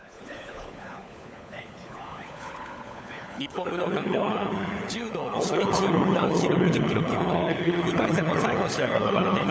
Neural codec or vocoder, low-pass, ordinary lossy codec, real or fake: codec, 16 kHz, 16 kbps, FunCodec, trained on LibriTTS, 50 frames a second; none; none; fake